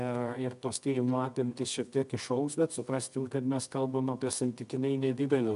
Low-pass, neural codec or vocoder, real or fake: 10.8 kHz; codec, 24 kHz, 0.9 kbps, WavTokenizer, medium music audio release; fake